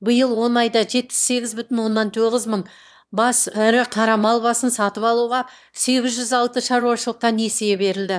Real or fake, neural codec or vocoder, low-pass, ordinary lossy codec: fake; autoencoder, 22.05 kHz, a latent of 192 numbers a frame, VITS, trained on one speaker; none; none